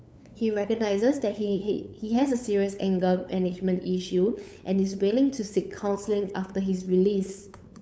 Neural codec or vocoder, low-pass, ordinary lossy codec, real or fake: codec, 16 kHz, 8 kbps, FunCodec, trained on LibriTTS, 25 frames a second; none; none; fake